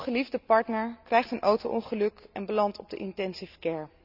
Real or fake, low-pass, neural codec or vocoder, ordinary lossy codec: real; 5.4 kHz; none; none